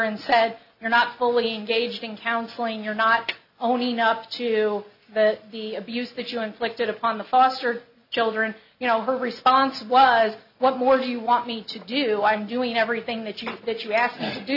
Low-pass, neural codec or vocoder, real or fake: 5.4 kHz; none; real